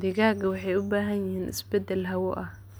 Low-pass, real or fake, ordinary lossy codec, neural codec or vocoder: none; real; none; none